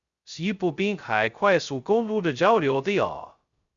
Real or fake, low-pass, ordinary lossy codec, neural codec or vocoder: fake; 7.2 kHz; Opus, 64 kbps; codec, 16 kHz, 0.2 kbps, FocalCodec